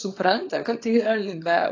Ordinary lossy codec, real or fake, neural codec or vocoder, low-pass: AAC, 32 kbps; fake; codec, 24 kHz, 0.9 kbps, WavTokenizer, small release; 7.2 kHz